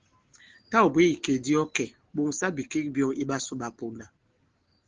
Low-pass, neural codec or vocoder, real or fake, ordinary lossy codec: 7.2 kHz; none; real; Opus, 16 kbps